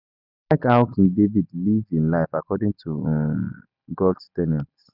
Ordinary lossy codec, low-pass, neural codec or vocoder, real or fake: none; 5.4 kHz; none; real